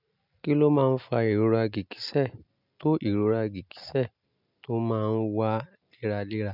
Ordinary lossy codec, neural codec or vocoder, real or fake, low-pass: none; none; real; 5.4 kHz